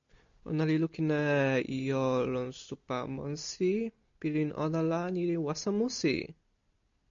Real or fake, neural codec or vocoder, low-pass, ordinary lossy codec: real; none; 7.2 kHz; MP3, 64 kbps